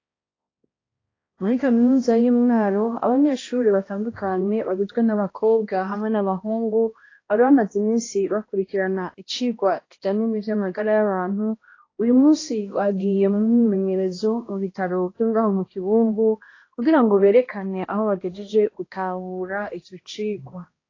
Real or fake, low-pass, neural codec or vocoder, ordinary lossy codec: fake; 7.2 kHz; codec, 16 kHz, 1 kbps, X-Codec, HuBERT features, trained on balanced general audio; AAC, 32 kbps